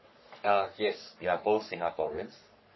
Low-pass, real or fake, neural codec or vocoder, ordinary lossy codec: 7.2 kHz; fake; codec, 44.1 kHz, 3.4 kbps, Pupu-Codec; MP3, 24 kbps